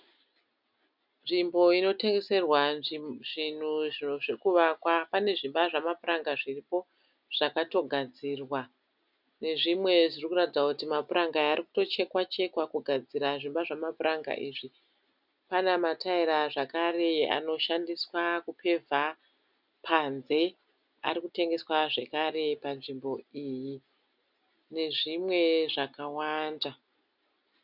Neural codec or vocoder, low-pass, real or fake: none; 5.4 kHz; real